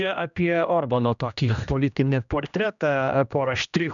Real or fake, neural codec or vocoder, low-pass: fake; codec, 16 kHz, 1 kbps, X-Codec, HuBERT features, trained on general audio; 7.2 kHz